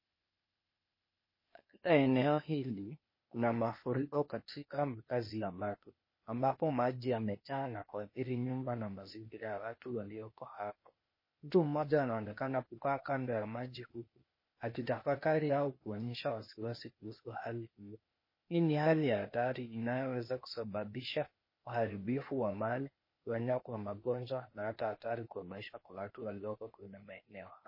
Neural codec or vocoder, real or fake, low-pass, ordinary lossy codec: codec, 16 kHz, 0.8 kbps, ZipCodec; fake; 5.4 kHz; MP3, 24 kbps